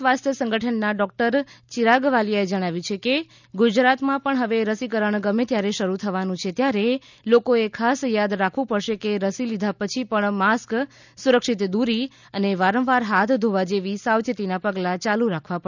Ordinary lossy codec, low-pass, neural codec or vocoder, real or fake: none; 7.2 kHz; none; real